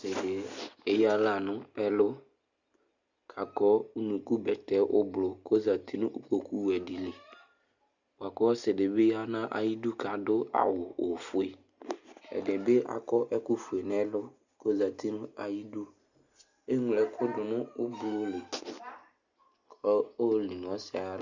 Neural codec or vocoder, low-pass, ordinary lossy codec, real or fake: none; 7.2 kHz; Opus, 64 kbps; real